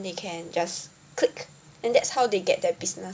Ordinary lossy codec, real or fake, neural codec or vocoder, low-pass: none; real; none; none